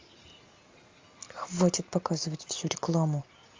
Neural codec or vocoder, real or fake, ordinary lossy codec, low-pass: none; real; Opus, 32 kbps; 7.2 kHz